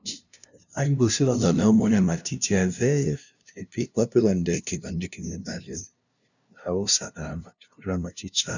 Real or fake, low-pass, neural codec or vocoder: fake; 7.2 kHz; codec, 16 kHz, 0.5 kbps, FunCodec, trained on LibriTTS, 25 frames a second